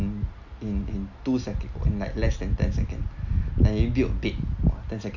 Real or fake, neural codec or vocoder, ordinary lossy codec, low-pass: real; none; none; 7.2 kHz